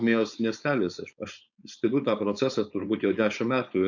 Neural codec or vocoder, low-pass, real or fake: codec, 16 kHz, 4.8 kbps, FACodec; 7.2 kHz; fake